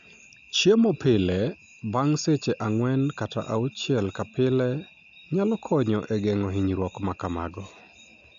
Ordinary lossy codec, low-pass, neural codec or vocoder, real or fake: none; 7.2 kHz; none; real